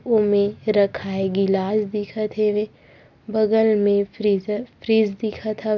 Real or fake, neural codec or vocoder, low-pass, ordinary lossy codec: real; none; 7.2 kHz; none